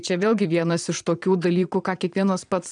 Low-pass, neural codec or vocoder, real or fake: 9.9 kHz; vocoder, 22.05 kHz, 80 mel bands, Vocos; fake